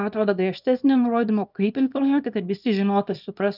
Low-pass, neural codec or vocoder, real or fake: 5.4 kHz; codec, 24 kHz, 0.9 kbps, WavTokenizer, small release; fake